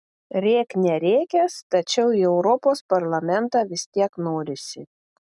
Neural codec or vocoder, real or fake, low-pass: none; real; 10.8 kHz